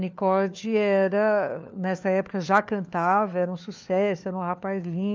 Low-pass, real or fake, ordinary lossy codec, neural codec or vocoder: none; fake; none; codec, 16 kHz, 4 kbps, FunCodec, trained on LibriTTS, 50 frames a second